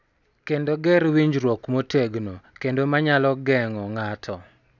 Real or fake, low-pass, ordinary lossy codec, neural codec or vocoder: real; 7.2 kHz; none; none